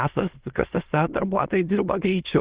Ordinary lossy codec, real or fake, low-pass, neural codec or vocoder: Opus, 16 kbps; fake; 3.6 kHz; autoencoder, 22.05 kHz, a latent of 192 numbers a frame, VITS, trained on many speakers